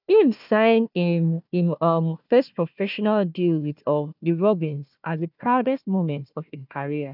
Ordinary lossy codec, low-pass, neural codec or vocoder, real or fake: none; 5.4 kHz; codec, 16 kHz, 1 kbps, FunCodec, trained on Chinese and English, 50 frames a second; fake